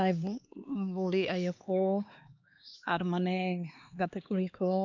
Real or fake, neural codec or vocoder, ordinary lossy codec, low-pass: fake; codec, 16 kHz, 2 kbps, X-Codec, HuBERT features, trained on LibriSpeech; none; 7.2 kHz